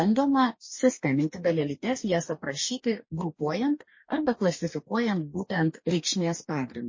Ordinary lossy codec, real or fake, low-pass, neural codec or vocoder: MP3, 32 kbps; fake; 7.2 kHz; codec, 44.1 kHz, 2.6 kbps, DAC